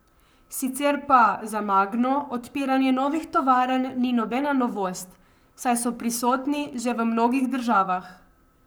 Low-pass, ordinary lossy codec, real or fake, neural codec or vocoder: none; none; fake; codec, 44.1 kHz, 7.8 kbps, Pupu-Codec